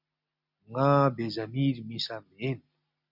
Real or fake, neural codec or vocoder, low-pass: real; none; 5.4 kHz